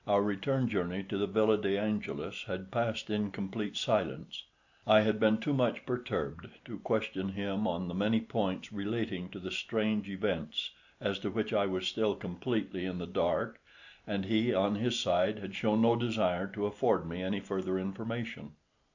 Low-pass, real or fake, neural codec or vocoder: 7.2 kHz; real; none